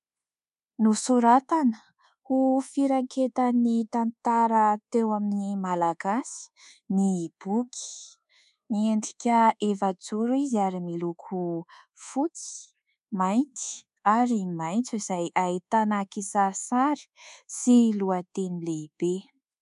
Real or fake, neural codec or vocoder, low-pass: fake; codec, 24 kHz, 1.2 kbps, DualCodec; 10.8 kHz